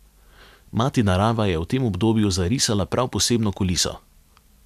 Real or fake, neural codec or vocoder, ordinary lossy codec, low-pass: real; none; none; 14.4 kHz